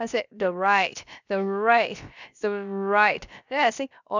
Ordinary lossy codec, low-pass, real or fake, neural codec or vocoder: none; 7.2 kHz; fake; codec, 16 kHz, about 1 kbps, DyCAST, with the encoder's durations